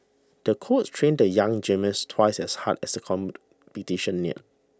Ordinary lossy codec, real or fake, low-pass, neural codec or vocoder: none; real; none; none